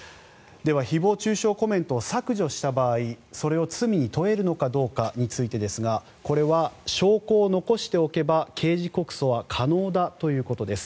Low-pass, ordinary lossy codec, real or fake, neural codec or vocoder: none; none; real; none